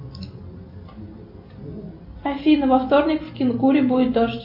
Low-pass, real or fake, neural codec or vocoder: 5.4 kHz; real; none